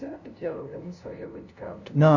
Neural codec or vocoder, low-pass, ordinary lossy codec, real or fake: codec, 16 kHz, 0.5 kbps, FunCodec, trained on LibriTTS, 25 frames a second; 7.2 kHz; none; fake